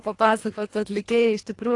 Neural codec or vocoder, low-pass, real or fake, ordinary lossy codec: codec, 24 kHz, 1.5 kbps, HILCodec; 10.8 kHz; fake; AAC, 48 kbps